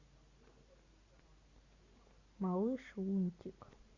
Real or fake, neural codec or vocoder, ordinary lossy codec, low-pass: real; none; Opus, 64 kbps; 7.2 kHz